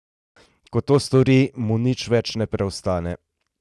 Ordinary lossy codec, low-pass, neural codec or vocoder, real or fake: none; none; none; real